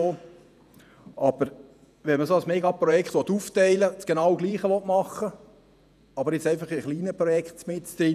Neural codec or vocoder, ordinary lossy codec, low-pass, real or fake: vocoder, 48 kHz, 128 mel bands, Vocos; none; 14.4 kHz; fake